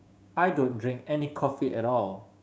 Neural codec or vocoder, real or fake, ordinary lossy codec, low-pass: codec, 16 kHz, 6 kbps, DAC; fake; none; none